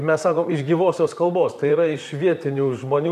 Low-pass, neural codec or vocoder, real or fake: 14.4 kHz; vocoder, 44.1 kHz, 128 mel bands, Pupu-Vocoder; fake